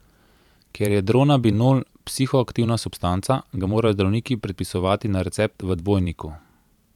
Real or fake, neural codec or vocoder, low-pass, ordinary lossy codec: fake; vocoder, 44.1 kHz, 128 mel bands every 512 samples, BigVGAN v2; 19.8 kHz; none